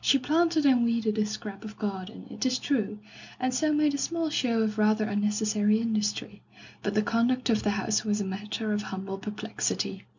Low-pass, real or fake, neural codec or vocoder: 7.2 kHz; real; none